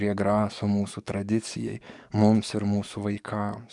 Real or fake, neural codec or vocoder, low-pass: fake; codec, 44.1 kHz, 7.8 kbps, DAC; 10.8 kHz